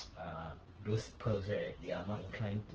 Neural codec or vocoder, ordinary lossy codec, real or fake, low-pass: codec, 16 kHz, 4 kbps, FreqCodec, smaller model; Opus, 16 kbps; fake; 7.2 kHz